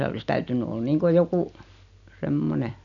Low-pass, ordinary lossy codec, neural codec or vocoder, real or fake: 7.2 kHz; none; none; real